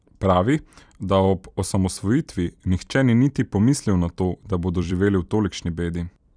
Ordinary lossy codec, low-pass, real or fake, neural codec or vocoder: none; 9.9 kHz; fake; vocoder, 44.1 kHz, 128 mel bands every 256 samples, BigVGAN v2